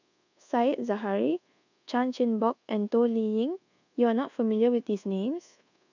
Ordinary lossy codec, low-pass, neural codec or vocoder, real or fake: none; 7.2 kHz; codec, 24 kHz, 1.2 kbps, DualCodec; fake